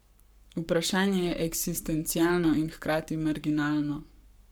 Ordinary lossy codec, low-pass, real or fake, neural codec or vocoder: none; none; fake; codec, 44.1 kHz, 7.8 kbps, Pupu-Codec